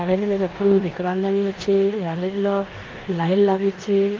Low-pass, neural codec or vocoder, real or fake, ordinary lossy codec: 7.2 kHz; codec, 16 kHz in and 24 kHz out, 0.9 kbps, LongCat-Audio-Codec, four codebook decoder; fake; Opus, 32 kbps